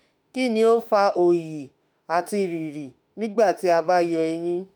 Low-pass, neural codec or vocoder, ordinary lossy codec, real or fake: none; autoencoder, 48 kHz, 32 numbers a frame, DAC-VAE, trained on Japanese speech; none; fake